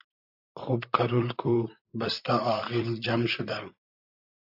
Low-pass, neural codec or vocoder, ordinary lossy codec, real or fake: 5.4 kHz; vocoder, 44.1 kHz, 128 mel bands, Pupu-Vocoder; AAC, 48 kbps; fake